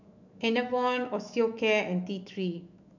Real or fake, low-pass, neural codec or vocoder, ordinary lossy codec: fake; 7.2 kHz; autoencoder, 48 kHz, 128 numbers a frame, DAC-VAE, trained on Japanese speech; none